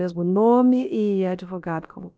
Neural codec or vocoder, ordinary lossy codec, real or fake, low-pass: codec, 16 kHz, about 1 kbps, DyCAST, with the encoder's durations; none; fake; none